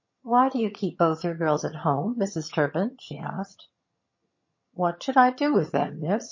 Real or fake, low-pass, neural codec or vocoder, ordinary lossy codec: fake; 7.2 kHz; vocoder, 22.05 kHz, 80 mel bands, HiFi-GAN; MP3, 32 kbps